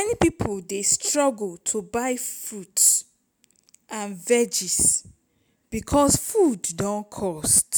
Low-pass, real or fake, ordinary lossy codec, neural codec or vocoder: none; real; none; none